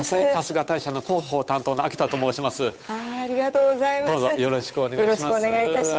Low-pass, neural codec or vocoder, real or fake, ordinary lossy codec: none; codec, 16 kHz, 8 kbps, FunCodec, trained on Chinese and English, 25 frames a second; fake; none